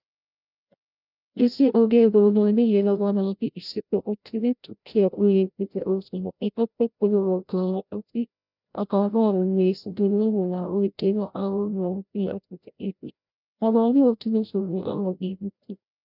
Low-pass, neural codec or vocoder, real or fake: 5.4 kHz; codec, 16 kHz, 0.5 kbps, FreqCodec, larger model; fake